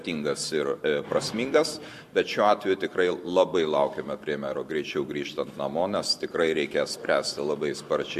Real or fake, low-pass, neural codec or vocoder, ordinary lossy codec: fake; 14.4 kHz; vocoder, 44.1 kHz, 128 mel bands every 512 samples, BigVGAN v2; MP3, 64 kbps